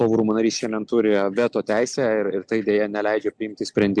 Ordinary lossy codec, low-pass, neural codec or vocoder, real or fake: Opus, 64 kbps; 9.9 kHz; none; real